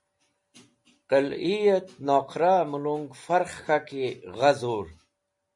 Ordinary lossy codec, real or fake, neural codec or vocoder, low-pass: MP3, 48 kbps; real; none; 10.8 kHz